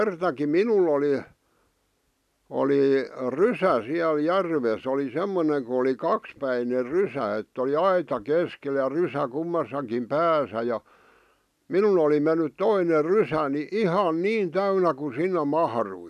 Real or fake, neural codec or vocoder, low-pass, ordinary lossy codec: real; none; 14.4 kHz; none